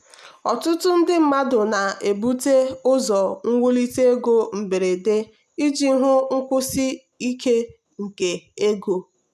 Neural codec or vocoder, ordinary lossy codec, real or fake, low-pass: none; none; real; 14.4 kHz